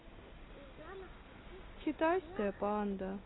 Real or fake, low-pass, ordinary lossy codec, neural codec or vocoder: real; 7.2 kHz; AAC, 16 kbps; none